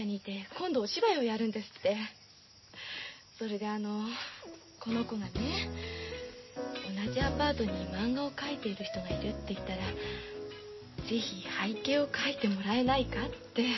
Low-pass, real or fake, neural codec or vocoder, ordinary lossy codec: 7.2 kHz; real; none; MP3, 24 kbps